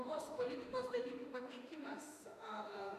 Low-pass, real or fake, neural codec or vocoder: 14.4 kHz; fake; autoencoder, 48 kHz, 32 numbers a frame, DAC-VAE, trained on Japanese speech